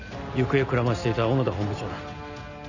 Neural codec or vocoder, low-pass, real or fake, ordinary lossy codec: none; 7.2 kHz; real; none